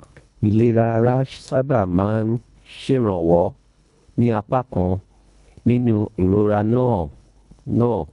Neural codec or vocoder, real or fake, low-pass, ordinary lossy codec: codec, 24 kHz, 1.5 kbps, HILCodec; fake; 10.8 kHz; none